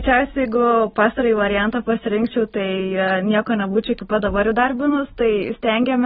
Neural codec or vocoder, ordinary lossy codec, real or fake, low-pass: none; AAC, 16 kbps; real; 9.9 kHz